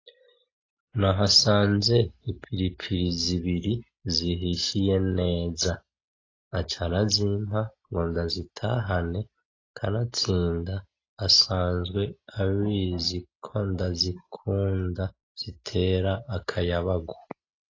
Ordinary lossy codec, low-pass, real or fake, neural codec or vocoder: AAC, 32 kbps; 7.2 kHz; real; none